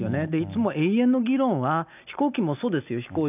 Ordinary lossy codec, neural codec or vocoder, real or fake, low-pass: none; none; real; 3.6 kHz